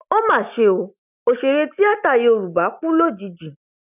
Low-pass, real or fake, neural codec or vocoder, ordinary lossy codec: 3.6 kHz; real; none; none